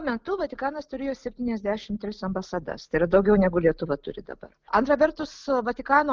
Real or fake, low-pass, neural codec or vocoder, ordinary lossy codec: real; 7.2 kHz; none; Opus, 32 kbps